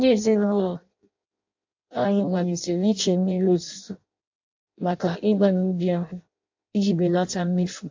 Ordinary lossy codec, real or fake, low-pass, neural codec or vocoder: AAC, 48 kbps; fake; 7.2 kHz; codec, 16 kHz in and 24 kHz out, 0.6 kbps, FireRedTTS-2 codec